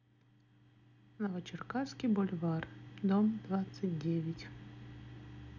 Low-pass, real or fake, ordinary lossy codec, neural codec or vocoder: 7.2 kHz; real; none; none